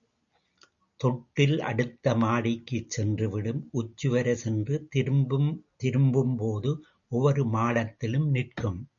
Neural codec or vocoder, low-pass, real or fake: none; 7.2 kHz; real